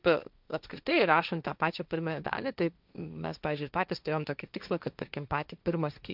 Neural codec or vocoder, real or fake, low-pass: codec, 16 kHz, 1.1 kbps, Voila-Tokenizer; fake; 5.4 kHz